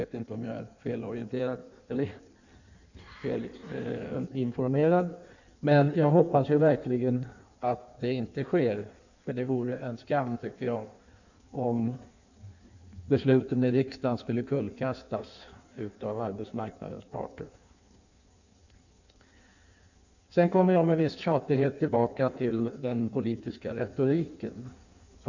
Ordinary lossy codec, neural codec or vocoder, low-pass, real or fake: none; codec, 16 kHz in and 24 kHz out, 1.1 kbps, FireRedTTS-2 codec; 7.2 kHz; fake